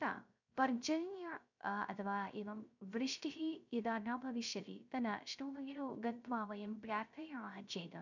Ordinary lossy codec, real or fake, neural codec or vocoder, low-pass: none; fake; codec, 16 kHz, 0.3 kbps, FocalCodec; 7.2 kHz